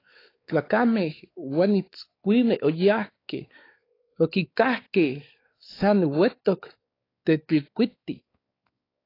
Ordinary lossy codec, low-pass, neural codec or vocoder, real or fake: AAC, 24 kbps; 5.4 kHz; codec, 16 kHz, 4 kbps, X-Codec, WavLM features, trained on Multilingual LibriSpeech; fake